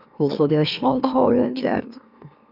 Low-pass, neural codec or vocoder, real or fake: 5.4 kHz; autoencoder, 44.1 kHz, a latent of 192 numbers a frame, MeloTTS; fake